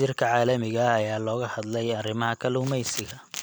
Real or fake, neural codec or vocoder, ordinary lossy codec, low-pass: fake; vocoder, 44.1 kHz, 128 mel bands every 256 samples, BigVGAN v2; none; none